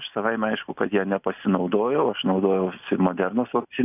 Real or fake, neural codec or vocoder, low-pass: real; none; 3.6 kHz